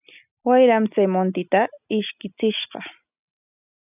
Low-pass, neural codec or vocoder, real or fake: 3.6 kHz; none; real